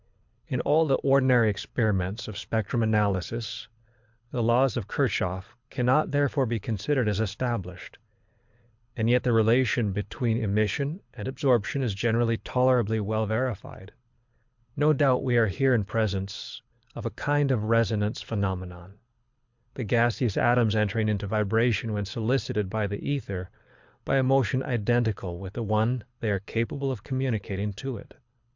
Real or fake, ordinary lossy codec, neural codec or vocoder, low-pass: fake; MP3, 64 kbps; codec, 24 kHz, 6 kbps, HILCodec; 7.2 kHz